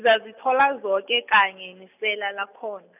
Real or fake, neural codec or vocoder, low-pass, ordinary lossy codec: real; none; 3.6 kHz; none